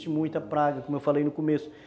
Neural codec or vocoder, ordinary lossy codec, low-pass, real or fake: none; none; none; real